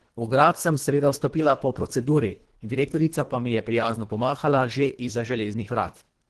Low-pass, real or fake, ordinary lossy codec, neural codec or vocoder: 10.8 kHz; fake; Opus, 16 kbps; codec, 24 kHz, 1.5 kbps, HILCodec